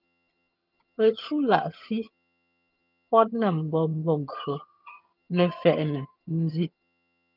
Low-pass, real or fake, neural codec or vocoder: 5.4 kHz; fake; vocoder, 22.05 kHz, 80 mel bands, HiFi-GAN